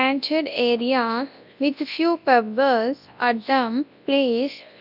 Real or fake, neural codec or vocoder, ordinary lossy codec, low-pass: fake; codec, 24 kHz, 0.9 kbps, WavTokenizer, large speech release; none; 5.4 kHz